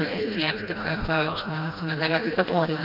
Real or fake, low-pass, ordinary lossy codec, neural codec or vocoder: fake; 5.4 kHz; AAC, 32 kbps; codec, 16 kHz, 1 kbps, FreqCodec, smaller model